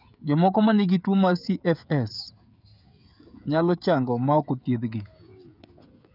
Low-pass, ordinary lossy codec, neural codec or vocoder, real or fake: 5.4 kHz; none; codec, 16 kHz, 16 kbps, FreqCodec, smaller model; fake